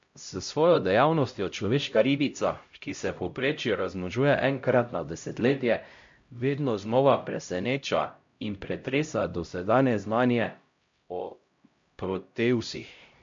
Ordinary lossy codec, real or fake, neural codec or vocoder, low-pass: MP3, 48 kbps; fake; codec, 16 kHz, 0.5 kbps, X-Codec, HuBERT features, trained on LibriSpeech; 7.2 kHz